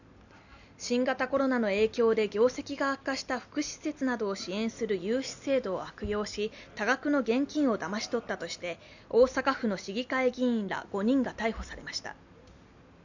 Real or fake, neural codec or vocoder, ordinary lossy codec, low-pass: real; none; none; 7.2 kHz